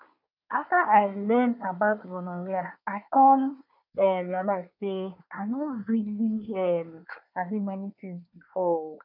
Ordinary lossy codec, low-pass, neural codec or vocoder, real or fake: none; 5.4 kHz; codec, 24 kHz, 1 kbps, SNAC; fake